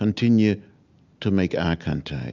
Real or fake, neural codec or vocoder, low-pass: real; none; 7.2 kHz